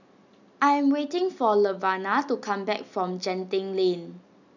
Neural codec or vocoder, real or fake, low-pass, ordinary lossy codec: none; real; 7.2 kHz; none